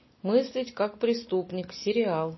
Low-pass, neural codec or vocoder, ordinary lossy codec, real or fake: 7.2 kHz; none; MP3, 24 kbps; real